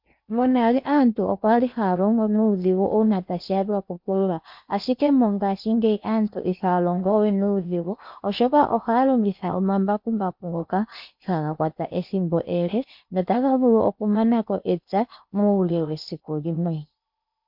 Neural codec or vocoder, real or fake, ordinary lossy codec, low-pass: codec, 16 kHz in and 24 kHz out, 0.8 kbps, FocalCodec, streaming, 65536 codes; fake; MP3, 48 kbps; 5.4 kHz